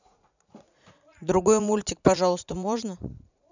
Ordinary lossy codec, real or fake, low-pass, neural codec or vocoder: none; fake; 7.2 kHz; vocoder, 44.1 kHz, 80 mel bands, Vocos